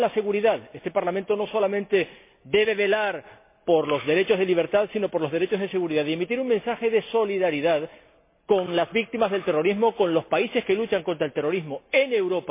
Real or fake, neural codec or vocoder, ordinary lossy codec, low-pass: real; none; MP3, 24 kbps; 3.6 kHz